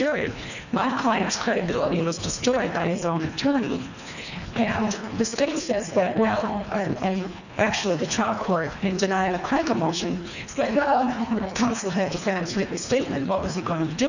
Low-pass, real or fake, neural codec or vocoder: 7.2 kHz; fake; codec, 24 kHz, 1.5 kbps, HILCodec